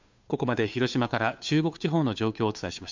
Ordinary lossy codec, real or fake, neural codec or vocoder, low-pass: MP3, 64 kbps; fake; codec, 16 kHz, 2 kbps, FunCodec, trained on Chinese and English, 25 frames a second; 7.2 kHz